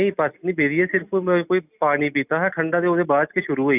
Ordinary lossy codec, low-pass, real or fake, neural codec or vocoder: none; 3.6 kHz; real; none